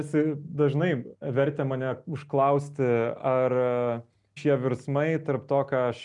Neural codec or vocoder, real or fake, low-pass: none; real; 10.8 kHz